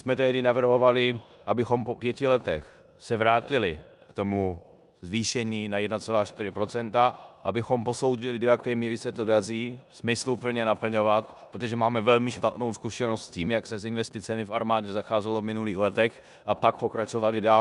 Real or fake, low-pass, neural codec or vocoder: fake; 10.8 kHz; codec, 16 kHz in and 24 kHz out, 0.9 kbps, LongCat-Audio-Codec, four codebook decoder